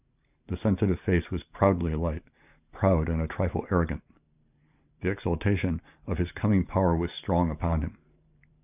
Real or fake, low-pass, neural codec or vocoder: fake; 3.6 kHz; vocoder, 44.1 kHz, 128 mel bands every 512 samples, BigVGAN v2